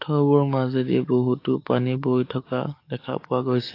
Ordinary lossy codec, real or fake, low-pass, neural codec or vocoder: AAC, 32 kbps; fake; 5.4 kHz; codec, 44.1 kHz, 7.8 kbps, DAC